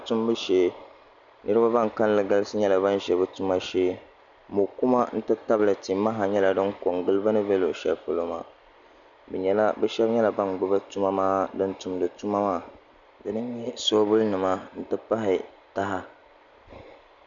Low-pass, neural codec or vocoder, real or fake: 7.2 kHz; none; real